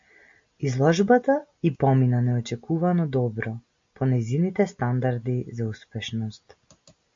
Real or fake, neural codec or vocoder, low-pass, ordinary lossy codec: real; none; 7.2 kHz; MP3, 48 kbps